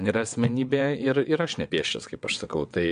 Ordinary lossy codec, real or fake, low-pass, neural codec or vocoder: MP3, 64 kbps; fake; 9.9 kHz; vocoder, 22.05 kHz, 80 mel bands, WaveNeXt